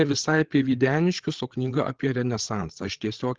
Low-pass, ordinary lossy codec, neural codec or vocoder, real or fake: 7.2 kHz; Opus, 16 kbps; codec, 16 kHz, 8 kbps, FreqCodec, larger model; fake